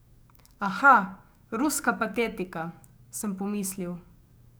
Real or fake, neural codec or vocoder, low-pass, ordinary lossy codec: fake; codec, 44.1 kHz, 7.8 kbps, DAC; none; none